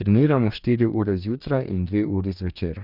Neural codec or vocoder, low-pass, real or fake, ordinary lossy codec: codec, 44.1 kHz, 2.6 kbps, DAC; 5.4 kHz; fake; none